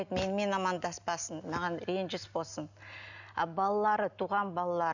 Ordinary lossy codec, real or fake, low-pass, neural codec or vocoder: none; real; 7.2 kHz; none